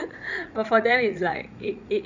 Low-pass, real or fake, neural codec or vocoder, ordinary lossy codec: 7.2 kHz; fake; vocoder, 44.1 kHz, 80 mel bands, Vocos; none